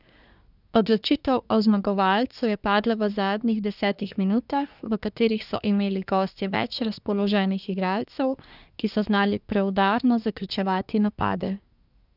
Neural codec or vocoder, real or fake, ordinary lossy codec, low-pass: codec, 24 kHz, 1 kbps, SNAC; fake; none; 5.4 kHz